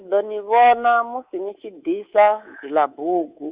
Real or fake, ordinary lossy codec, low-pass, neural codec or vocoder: fake; Opus, 64 kbps; 3.6 kHz; codec, 24 kHz, 3.1 kbps, DualCodec